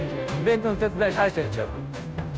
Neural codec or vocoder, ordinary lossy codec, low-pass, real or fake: codec, 16 kHz, 0.5 kbps, FunCodec, trained on Chinese and English, 25 frames a second; none; none; fake